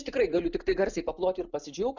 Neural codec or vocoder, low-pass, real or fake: none; 7.2 kHz; real